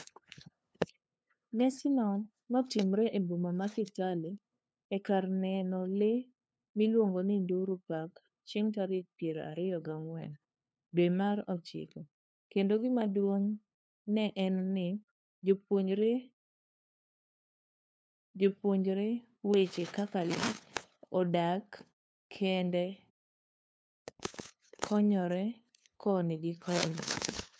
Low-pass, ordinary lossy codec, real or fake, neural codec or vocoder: none; none; fake; codec, 16 kHz, 2 kbps, FunCodec, trained on LibriTTS, 25 frames a second